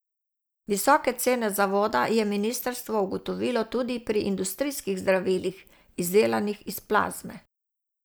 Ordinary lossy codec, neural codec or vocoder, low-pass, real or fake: none; none; none; real